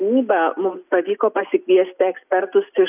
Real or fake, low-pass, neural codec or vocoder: real; 3.6 kHz; none